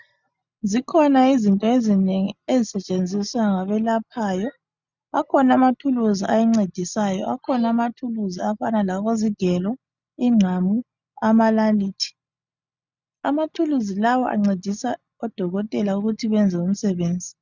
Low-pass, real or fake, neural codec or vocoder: 7.2 kHz; real; none